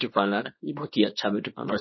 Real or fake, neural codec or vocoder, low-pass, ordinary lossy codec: fake; codec, 24 kHz, 0.9 kbps, WavTokenizer, small release; 7.2 kHz; MP3, 24 kbps